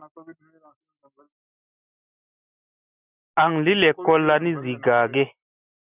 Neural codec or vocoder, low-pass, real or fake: none; 3.6 kHz; real